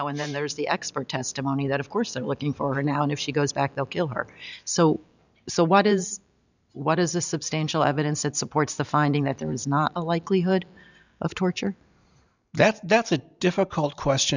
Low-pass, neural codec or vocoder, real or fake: 7.2 kHz; vocoder, 44.1 kHz, 128 mel bands every 512 samples, BigVGAN v2; fake